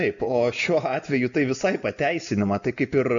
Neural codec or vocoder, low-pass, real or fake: none; 7.2 kHz; real